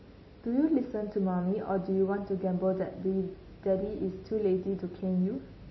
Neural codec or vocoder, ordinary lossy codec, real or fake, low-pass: none; MP3, 24 kbps; real; 7.2 kHz